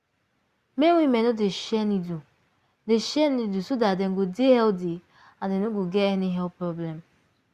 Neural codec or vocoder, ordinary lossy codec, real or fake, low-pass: none; Opus, 64 kbps; real; 14.4 kHz